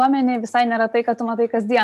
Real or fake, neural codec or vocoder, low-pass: real; none; 14.4 kHz